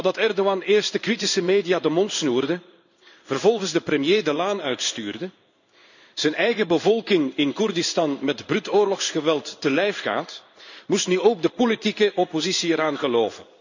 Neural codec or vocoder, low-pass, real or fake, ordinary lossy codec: codec, 16 kHz in and 24 kHz out, 1 kbps, XY-Tokenizer; 7.2 kHz; fake; none